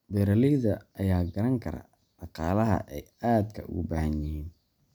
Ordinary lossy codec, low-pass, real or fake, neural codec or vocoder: none; none; real; none